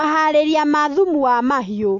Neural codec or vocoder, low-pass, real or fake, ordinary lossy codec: none; 7.2 kHz; real; AAC, 48 kbps